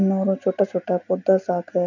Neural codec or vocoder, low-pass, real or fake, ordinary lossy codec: none; 7.2 kHz; real; none